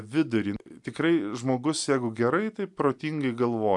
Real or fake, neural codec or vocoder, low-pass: real; none; 10.8 kHz